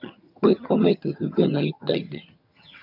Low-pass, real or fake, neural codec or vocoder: 5.4 kHz; fake; vocoder, 22.05 kHz, 80 mel bands, HiFi-GAN